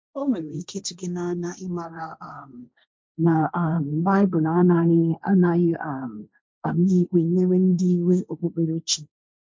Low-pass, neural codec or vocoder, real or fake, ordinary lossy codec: none; codec, 16 kHz, 1.1 kbps, Voila-Tokenizer; fake; none